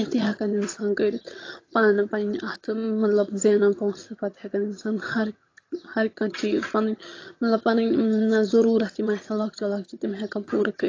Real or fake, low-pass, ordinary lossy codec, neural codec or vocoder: fake; 7.2 kHz; AAC, 32 kbps; vocoder, 22.05 kHz, 80 mel bands, HiFi-GAN